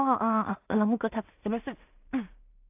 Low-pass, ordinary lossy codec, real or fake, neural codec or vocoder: 3.6 kHz; AAC, 32 kbps; fake; codec, 16 kHz in and 24 kHz out, 0.4 kbps, LongCat-Audio-Codec, two codebook decoder